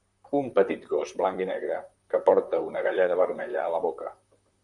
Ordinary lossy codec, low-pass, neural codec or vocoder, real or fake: MP3, 96 kbps; 10.8 kHz; vocoder, 44.1 kHz, 128 mel bands, Pupu-Vocoder; fake